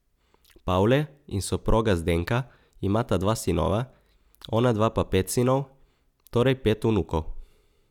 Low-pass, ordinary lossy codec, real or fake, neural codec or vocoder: 19.8 kHz; none; real; none